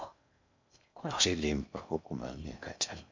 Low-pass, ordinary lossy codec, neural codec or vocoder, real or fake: 7.2 kHz; MP3, 64 kbps; codec, 16 kHz in and 24 kHz out, 0.6 kbps, FocalCodec, streaming, 4096 codes; fake